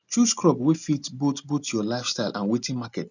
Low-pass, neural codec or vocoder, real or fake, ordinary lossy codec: 7.2 kHz; none; real; none